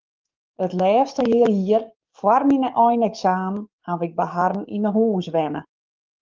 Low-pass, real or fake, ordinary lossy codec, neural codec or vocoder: 7.2 kHz; fake; Opus, 32 kbps; codec, 44.1 kHz, 7.8 kbps, DAC